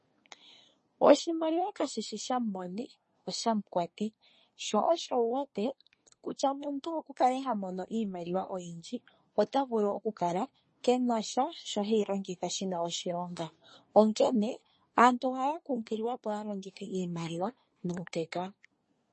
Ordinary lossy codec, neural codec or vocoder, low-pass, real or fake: MP3, 32 kbps; codec, 24 kHz, 1 kbps, SNAC; 10.8 kHz; fake